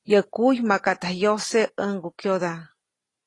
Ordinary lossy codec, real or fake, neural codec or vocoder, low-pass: AAC, 32 kbps; real; none; 10.8 kHz